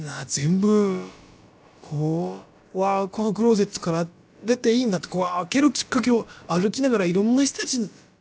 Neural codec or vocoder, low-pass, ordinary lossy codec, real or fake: codec, 16 kHz, about 1 kbps, DyCAST, with the encoder's durations; none; none; fake